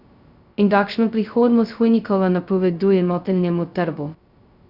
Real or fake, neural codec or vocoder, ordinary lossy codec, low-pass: fake; codec, 16 kHz, 0.2 kbps, FocalCodec; Opus, 64 kbps; 5.4 kHz